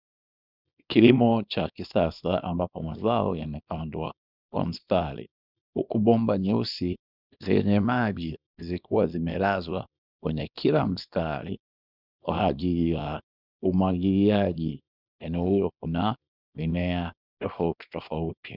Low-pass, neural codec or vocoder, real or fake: 5.4 kHz; codec, 24 kHz, 0.9 kbps, WavTokenizer, small release; fake